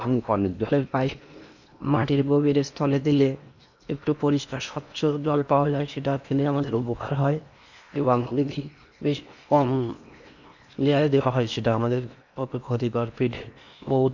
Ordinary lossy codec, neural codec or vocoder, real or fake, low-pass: none; codec, 16 kHz in and 24 kHz out, 0.8 kbps, FocalCodec, streaming, 65536 codes; fake; 7.2 kHz